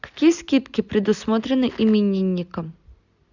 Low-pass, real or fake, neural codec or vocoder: 7.2 kHz; real; none